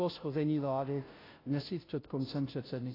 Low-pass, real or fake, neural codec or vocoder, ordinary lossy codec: 5.4 kHz; fake; codec, 16 kHz, 0.5 kbps, FunCodec, trained on Chinese and English, 25 frames a second; AAC, 24 kbps